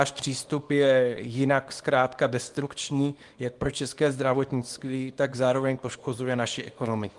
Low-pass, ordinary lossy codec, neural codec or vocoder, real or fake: 10.8 kHz; Opus, 24 kbps; codec, 24 kHz, 0.9 kbps, WavTokenizer, small release; fake